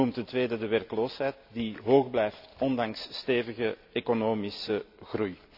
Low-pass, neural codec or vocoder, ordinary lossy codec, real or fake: 5.4 kHz; none; none; real